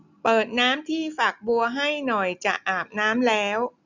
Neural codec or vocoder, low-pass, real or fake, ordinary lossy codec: none; 7.2 kHz; real; none